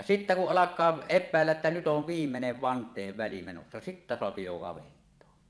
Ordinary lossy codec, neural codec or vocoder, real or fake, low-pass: none; vocoder, 22.05 kHz, 80 mel bands, Vocos; fake; none